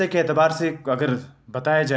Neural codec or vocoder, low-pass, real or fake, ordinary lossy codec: none; none; real; none